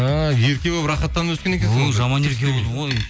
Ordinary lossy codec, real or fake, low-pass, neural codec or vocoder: none; real; none; none